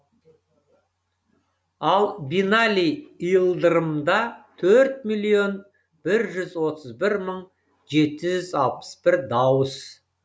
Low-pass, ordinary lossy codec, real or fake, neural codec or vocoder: none; none; real; none